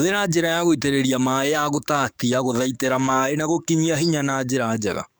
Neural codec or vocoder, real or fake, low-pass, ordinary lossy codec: codec, 44.1 kHz, 7.8 kbps, DAC; fake; none; none